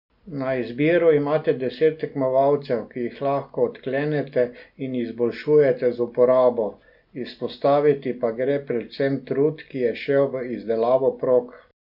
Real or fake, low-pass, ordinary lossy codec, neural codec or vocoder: real; 5.4 kHz; none; none